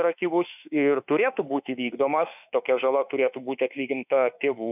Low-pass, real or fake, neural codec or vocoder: 3.6 kHz; fake; autoencoder, 48 kHz, 32 numbers a frame, DAC-VAE, trained on Japanese speech